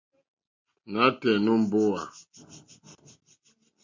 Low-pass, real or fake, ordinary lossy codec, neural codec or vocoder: 7.2 kHz; real; MP3, 48 kbps; none